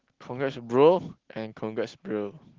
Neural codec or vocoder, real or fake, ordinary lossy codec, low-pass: none; real; Opus, 16 kbps; 7.2 kHz